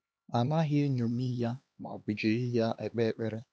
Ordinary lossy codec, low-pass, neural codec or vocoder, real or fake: none; none; codec, 16 kHz, 2 kbps, X-Codec, HuBERT features, trained on LibriSpeech; fake